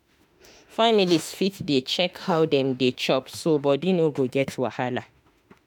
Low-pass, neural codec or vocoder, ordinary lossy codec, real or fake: none; autoencoder, 48 kHz, 32 numbers a frame, DAC-VAE, trained on Japanese speech; none; fake